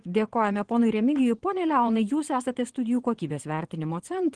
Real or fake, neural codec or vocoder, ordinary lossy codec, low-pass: fake; vocoder, 22.05 kHz, 80 mel bands, Vocos; Opus, 16 kbps; 9.9 kHz